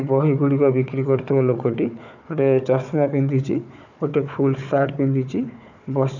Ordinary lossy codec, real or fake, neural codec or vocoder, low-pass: none; fake; codec, 16 kHz, 4 kbps, FunCodec, trained on Chinese and English, 50 frames a second; 7.2 kHz